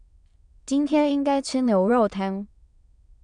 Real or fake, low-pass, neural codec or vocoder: fake; 9.9 kHz; autoencoder, 22.05 kHz, a latent of 192 numbers a frame, VITS, trained on many speakers